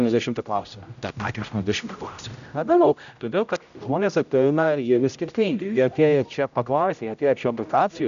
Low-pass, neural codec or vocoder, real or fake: 7.2 kHz; codec, 16 kHz, 0.5 kbps, X-Codec, HuBERT features, trained on general audio; fake